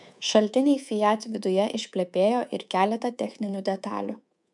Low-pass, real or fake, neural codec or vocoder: 10.8 kHz; fake; codec, 24 kHz, 3.1 kbps, DualCodec